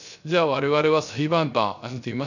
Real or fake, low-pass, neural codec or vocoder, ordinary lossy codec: fake; 7.2 kHz; codec, 16 kHz, 0.3 kbps, FocalCodec; none